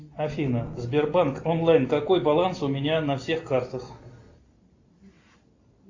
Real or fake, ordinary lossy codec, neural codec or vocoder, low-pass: real; AAC, 48 kbps; none; 7.2 kHz